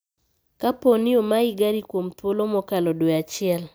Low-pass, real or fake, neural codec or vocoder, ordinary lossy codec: none; real; none; none